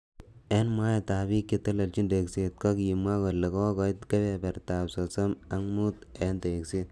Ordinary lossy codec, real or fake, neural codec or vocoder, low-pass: none; real; none; none